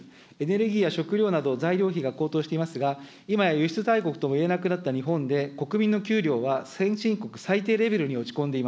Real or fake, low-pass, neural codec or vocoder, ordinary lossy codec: real; none; none; none